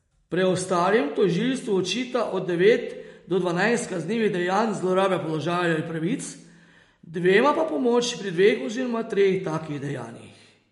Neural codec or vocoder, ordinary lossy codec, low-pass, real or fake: none; MP3, 48 kbps; 14.4 kHz; real